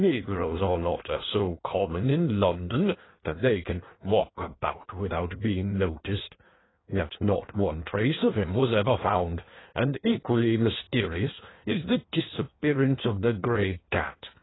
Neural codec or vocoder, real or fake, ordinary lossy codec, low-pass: codec, 16 kHz in and 24 kHz out, 1.1 kbps, FireRedTTS-2 codec; fake; AAC, 16 kbps; 7.2 kHz